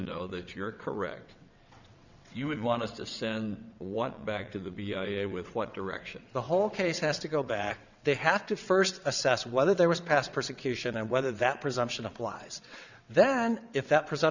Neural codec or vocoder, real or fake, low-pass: vocoder, 22.05 kHz, 80 mel bands, WaveNeXt; fake; 7.2 kHz